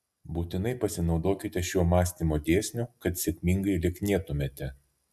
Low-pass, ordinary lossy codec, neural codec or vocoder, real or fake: 14.4 kHz; MP3, 96 kbps; none; real